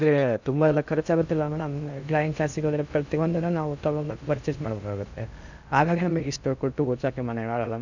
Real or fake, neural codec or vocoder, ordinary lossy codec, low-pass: fake; codec, 16 kHz in and 24 kHz out, 0.6 kbps, FocalCodec, streaming, 2048 codes; none; 7.2 kHz